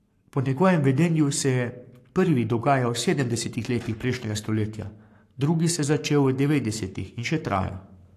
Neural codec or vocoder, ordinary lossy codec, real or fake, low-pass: codec, 44.1 kHz, 7.8 kbps, Pupu-Codec; AAC, 64 kbps; fake; 14.4 kHz